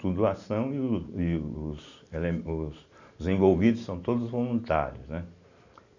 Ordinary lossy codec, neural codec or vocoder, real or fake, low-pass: none; none; real; 7.2 kHz